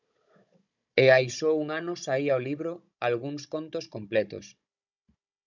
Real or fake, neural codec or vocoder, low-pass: fake; codec, 16 kHz, 16 kbps, FunCodec, trained on Chinese and English, 50 frames a second; 7.2 kHz